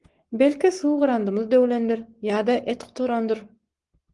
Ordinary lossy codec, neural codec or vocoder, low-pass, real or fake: Opus, 24 kbps; codec, 44.1 kHz, 7.8 kbps, Pupu-Codec; 10.8 kHz; fake